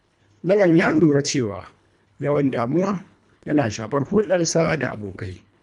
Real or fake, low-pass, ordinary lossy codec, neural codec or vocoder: fake; 10.8 kHz; none; codec, 24 kHz, 1.5 kbps, HILCodec